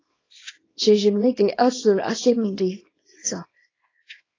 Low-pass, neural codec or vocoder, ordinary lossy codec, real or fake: 7.2 kHz; codec, 24 kHz, 0.9 kbps, WavTokenizer, small release; AAC, 32 kbps; fake